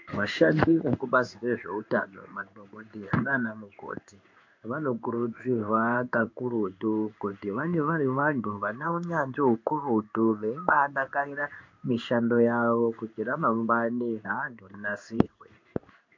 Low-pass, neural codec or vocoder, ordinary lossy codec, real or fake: 7.2 kHz; codec, 16 kHz in and 24 kHz out, 1 kbps, XY-Tokenizer; MP3, 48 kbps; fake